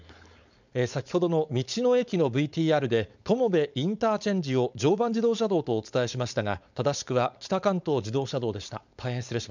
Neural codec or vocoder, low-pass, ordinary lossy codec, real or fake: codec, 16 kHz, 4.8 kbps, FACodec; 7.2 kHz; none; fake